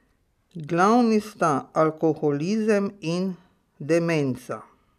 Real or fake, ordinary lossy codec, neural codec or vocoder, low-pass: real; none; none; 14.4 kHz